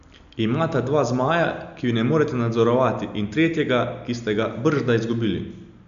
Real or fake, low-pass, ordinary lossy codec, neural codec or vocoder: real; 7.2 kHz; none; none